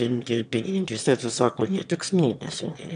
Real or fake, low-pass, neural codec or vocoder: fake; 9.9 kHz; autoencoder, 22.05 kHz, a latent of 192 numbers a frame, VITS, trained on one speaker